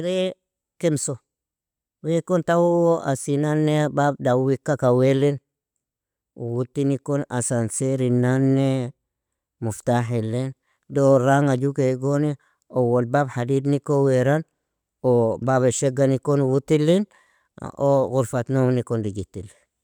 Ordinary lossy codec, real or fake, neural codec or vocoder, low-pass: none; real; none; 19.8 kHz